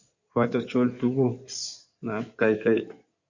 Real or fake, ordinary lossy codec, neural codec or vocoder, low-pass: fake; Opus, 64 kbps; codec, 16 kHz in and 24 kHz out, 2.2 kbps, FireRedTTS-2 codec; 7.2 kHz